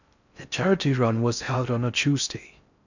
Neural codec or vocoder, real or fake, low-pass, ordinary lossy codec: codec, 16 kHz in and 24 kHz out, 0.6 kbps, FocalCodec, streaming, 4096 codes; fake; 7.2 kHz; none